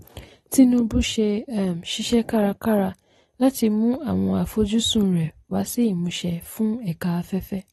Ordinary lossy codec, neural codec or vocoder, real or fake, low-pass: AAC, 32 kbps; none; real; 14.4 kHz